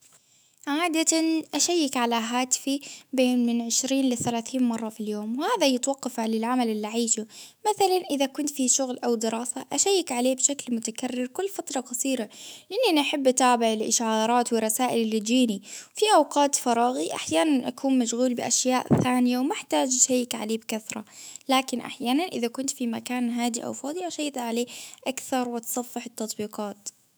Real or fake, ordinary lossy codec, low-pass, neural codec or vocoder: fake; none; none; autoencoder, 48 kHz, 128 numbers a frame, DAC-VAE, trained on Japanese speech